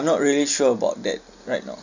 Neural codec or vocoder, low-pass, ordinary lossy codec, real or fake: none; 7.2 kHz; none; real